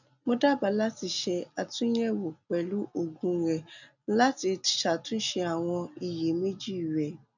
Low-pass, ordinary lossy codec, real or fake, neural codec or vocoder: 7.2 kHz; none; real; none